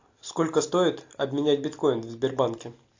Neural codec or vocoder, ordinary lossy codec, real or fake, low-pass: none; AAC, 48 kbps; real; 7.2 kHz